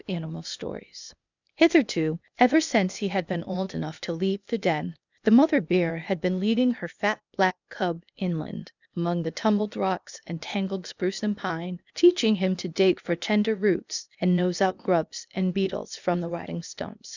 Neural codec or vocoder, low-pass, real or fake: codec, 16 kHz, 0.8 kbps, ZipCodec; 7.2 kHz; fake